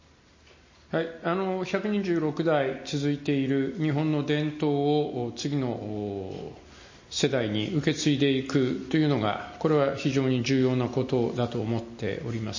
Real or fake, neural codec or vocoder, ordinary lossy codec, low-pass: real; none; MP3, 32 kbps; 7.2 kHz